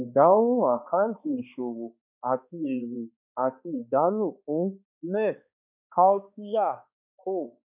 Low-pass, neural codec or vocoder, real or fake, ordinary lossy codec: 3.6 kHz; codec, 16 kHz, 2 kbps, X-Codec, HuBERT features, trained on balanced general audio; fake; none